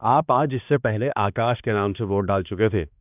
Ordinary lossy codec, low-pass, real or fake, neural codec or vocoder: none; 3.6 kHz; fake; codec, 16 kHz, 2 kbps, X-Codec, HuBERT features, trained on balanced general audio